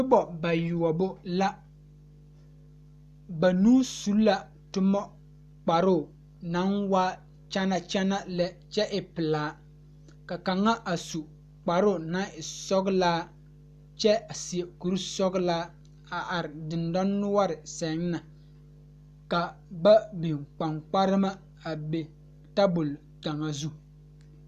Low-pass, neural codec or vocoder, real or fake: 14.4 kHz; none; real